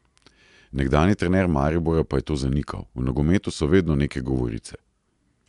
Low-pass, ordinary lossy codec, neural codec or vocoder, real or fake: 10.8 kHz; MP3, 96 kbps; none; real